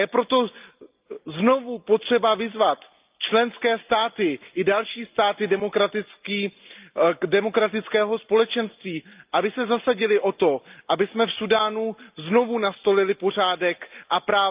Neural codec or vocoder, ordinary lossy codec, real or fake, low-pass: none; Opus, 24 kbps; real; 3.6 kHz